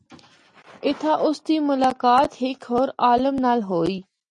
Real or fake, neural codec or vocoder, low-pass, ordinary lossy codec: real; none; 10.8 kHz; MP3, 48 kbps